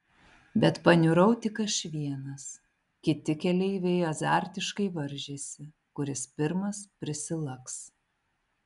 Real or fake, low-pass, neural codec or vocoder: real; 10.8 kHz; none